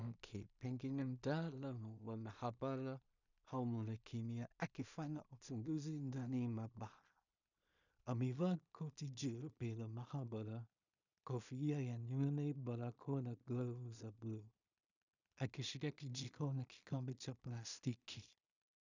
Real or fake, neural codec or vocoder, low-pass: fake; codec, 16 kHz in and 24 kHz out, 0.4 kbps, LongCat-Audio-Codec, two codebook decoder; 7.2 kHz